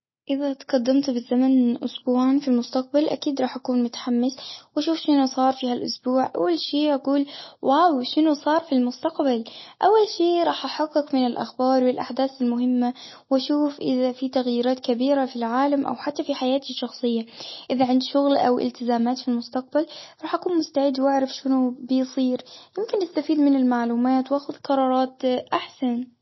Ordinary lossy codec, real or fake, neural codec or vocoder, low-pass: MP3, 24 kbps; real; none; 7.2 kHz